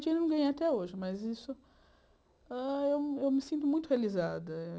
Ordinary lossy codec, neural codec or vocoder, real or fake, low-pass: none; none; real; none